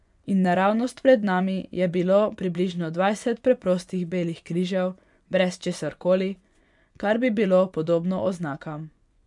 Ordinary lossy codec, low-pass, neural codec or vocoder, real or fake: none; 10.8 kHz; vocoder, 24 kHz, 100 mel bands, Vocos; fake